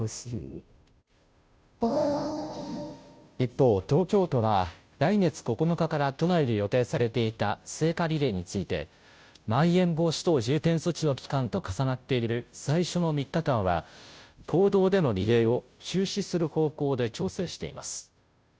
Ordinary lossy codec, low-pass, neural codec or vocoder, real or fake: none; none; codec, 16 kHz, 0.5 kbps, FunCodec, trained on Chinese and English, 25 frames a second; fake